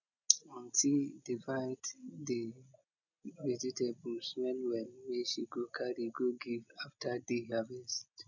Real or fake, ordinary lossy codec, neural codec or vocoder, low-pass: real; none; none; 7.2 kHz